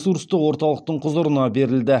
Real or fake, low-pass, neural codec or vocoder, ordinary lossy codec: real; none; none; none